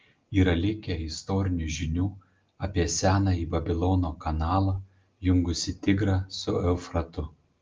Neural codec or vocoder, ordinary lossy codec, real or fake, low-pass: none; Opus, 24 kbps; real; 7.2 kHz